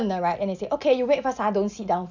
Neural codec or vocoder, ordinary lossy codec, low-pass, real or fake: none; none; 7.2 kHz; real